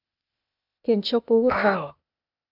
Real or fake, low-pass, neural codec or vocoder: fake; 5.4 kHz; codec, 16 kHz, 0.8 kbps, ZipCodec